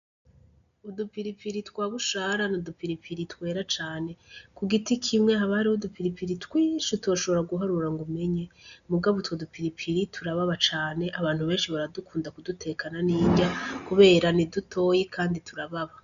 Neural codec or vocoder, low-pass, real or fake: none; 7.2 kHz; real